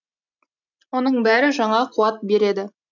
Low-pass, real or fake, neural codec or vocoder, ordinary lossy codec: 7.2 kHz; real; none; none